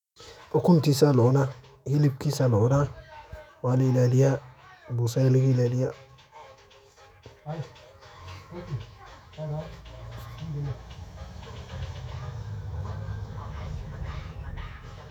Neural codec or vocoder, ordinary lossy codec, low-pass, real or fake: autoencoder, 48 kHz, 128 numbers a frame, DAC-VAE, trained on Japanese speech; none; 19.8 kHz; fake